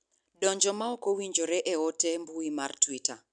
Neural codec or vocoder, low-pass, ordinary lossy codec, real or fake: none; 9.9 kHz; none; real